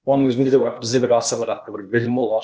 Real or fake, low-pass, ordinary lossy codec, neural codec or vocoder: fake; none; none; codec, 16 kHz, 0.8 kbps, ZipCodec